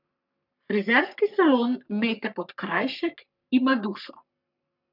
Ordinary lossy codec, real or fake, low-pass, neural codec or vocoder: none; fake; 5.4 kHz; codec, 44.1 kHz, 3.4 kbps, Pupu-Codec